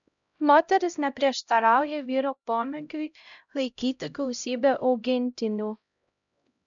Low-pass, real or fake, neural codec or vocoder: 7.2 kHz; fake; codec, 16 kHz, 0.5 kbps, X-Codec, HuBERT features, trained on LibriSpeech